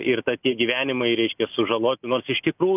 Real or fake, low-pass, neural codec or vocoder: real; 3.6 kHz; none